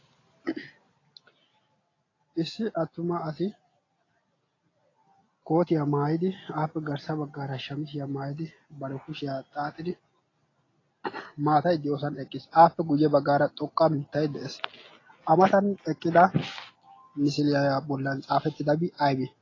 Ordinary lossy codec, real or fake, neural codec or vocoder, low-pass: AAC, 32 kbps; real; none; 7.2 kHz